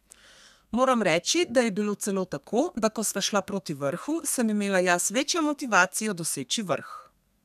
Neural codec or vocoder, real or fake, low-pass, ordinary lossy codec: codec, 32 kHz, 1.9 kbps, SNAC; fake; 14.4 kHz; none